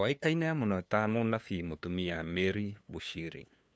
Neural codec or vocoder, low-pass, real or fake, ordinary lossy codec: codec, 16 kHz, 2 kbps, FunCodec, trained on LibriTTS, 25 frames a second; none; fake; none